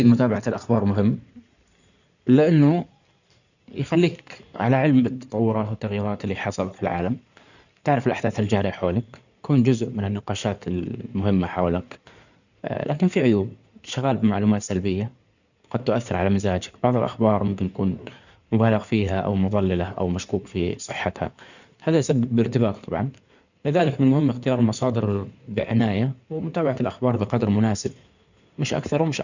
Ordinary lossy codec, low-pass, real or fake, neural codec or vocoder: none; 7.2 kHz; fake; codec, 16 kHz in and 24 kHz out, 2.2 kbps, FireRedTTS-2 codec